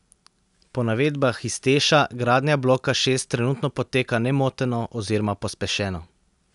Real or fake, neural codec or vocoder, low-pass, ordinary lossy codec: real; none; 10.8 kHz; none